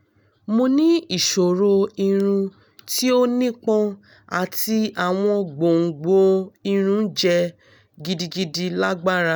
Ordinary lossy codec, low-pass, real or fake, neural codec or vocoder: none; none; real; none